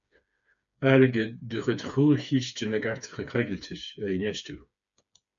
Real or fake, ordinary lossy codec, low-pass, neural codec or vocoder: fake; MP3, 96 kbps; 7.2 kHz; codec, 16 kHz, 4 kbps, FreqCodec, smaller model